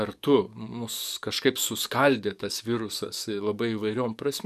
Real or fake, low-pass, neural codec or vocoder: fake; 14.4 kHz; codec, 44.1 kHz, 7.8 kbps, DAC